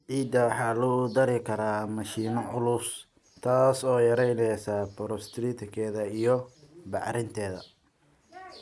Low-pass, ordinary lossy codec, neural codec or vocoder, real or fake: none; none; none; real